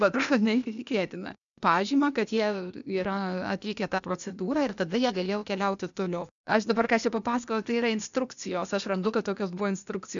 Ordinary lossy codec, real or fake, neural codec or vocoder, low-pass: MP3, 96 kbps; fake; codec, 16 kHz, 0.8 kbps, ZipCodec; 7.2 kHz